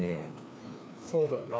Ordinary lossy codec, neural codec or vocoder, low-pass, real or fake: none; codec, 16 kHz, 2 kbps, FreqCodec, larger model; none; fake